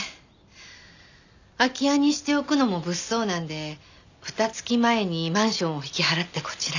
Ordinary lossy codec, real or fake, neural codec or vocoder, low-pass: none; real; none; 7.2 kHz